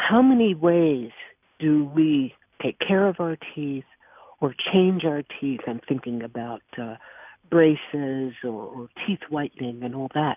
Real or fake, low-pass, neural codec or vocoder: real; 3.6 kHz; none